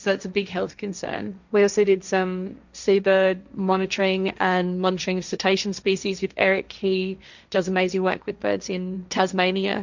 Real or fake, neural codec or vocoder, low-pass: fake; codec, 16 kHz, 1.1 kbps, Voila-Tokenizer; 7.2 kHz